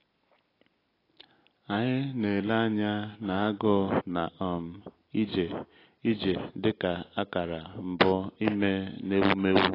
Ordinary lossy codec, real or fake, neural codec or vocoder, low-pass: AAC, 24 kbps; real; none; 5.4 kHz